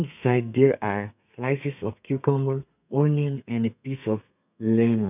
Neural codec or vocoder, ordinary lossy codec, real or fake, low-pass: codec, 32 kHz, 1.9 kbps, SNAC; none; fake; 3.6 kHz